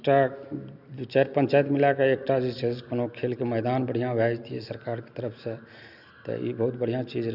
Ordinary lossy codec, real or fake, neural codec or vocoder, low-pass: none; real; none; 5.4 kHz